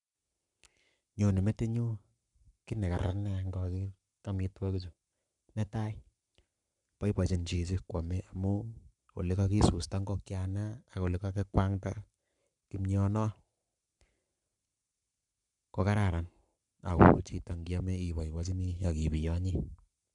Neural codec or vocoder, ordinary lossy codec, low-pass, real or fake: codec, 44.1 kHz, 7.8 kbps, Pupu-Codec; none; 10.8 kHz; fake